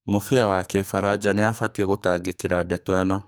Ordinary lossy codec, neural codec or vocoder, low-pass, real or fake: none; codec, 44.1 kHz, 2.6 kbps, SNAC; none; fake